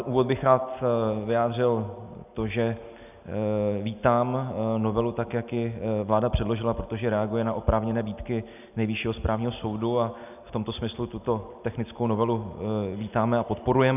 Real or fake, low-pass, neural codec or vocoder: real; 3.6 kHz; none